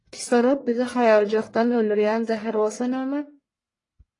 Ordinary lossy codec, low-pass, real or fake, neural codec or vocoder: AAC, 32 kbps; 10.8 kHz; fake; codec, 44.1 kHz, 1.7 kbps, Pupu-Codec